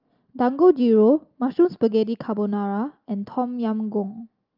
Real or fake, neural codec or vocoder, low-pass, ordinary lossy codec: real; none; 5.4 kHz; Opus, 24 kbps